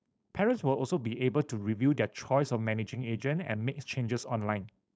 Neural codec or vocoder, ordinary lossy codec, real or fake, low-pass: codec, 16 kHz, 4.8 kbps, FACodec; none; fake; none